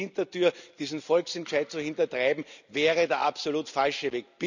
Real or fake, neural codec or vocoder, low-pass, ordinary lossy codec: real; none; 7.2 kHz; none